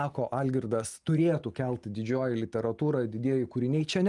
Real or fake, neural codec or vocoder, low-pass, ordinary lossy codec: real; none; 10.8 kHz; Opus, 32 kbps